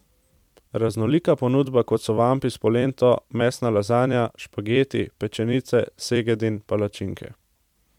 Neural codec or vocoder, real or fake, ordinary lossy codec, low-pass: vocoder, 44.1 kHz, 128 mel bands every 256 samples, BigVGAN v2; fake; MP3, 96 kbps; 19.8 kHz